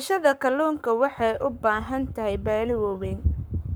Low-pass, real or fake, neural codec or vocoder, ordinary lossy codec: none; fake; codec, 44.1 kHz, 7.8 kbps, Pupu-Codec; none